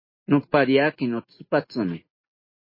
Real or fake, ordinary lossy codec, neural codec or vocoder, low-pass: real; MP3, 24 kbps; none; 5.4 kHz